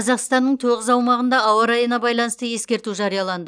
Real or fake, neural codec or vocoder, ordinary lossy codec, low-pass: real; none; none; 9.9 kHz